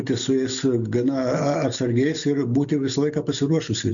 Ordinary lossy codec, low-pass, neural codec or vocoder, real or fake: MP3, 96 kbps; 7.2 kHz; none; real